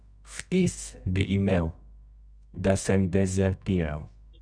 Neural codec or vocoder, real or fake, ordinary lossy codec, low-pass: codec, 24 kHz, 0.9 kbps, WavTokenizer, medium music audio release; fake; none; 9.9 kHz